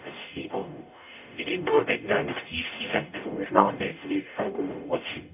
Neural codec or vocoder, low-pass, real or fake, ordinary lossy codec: codec, 44.1 kHz, 0.9 kbps, DAC; 3.6 kHz; fake; none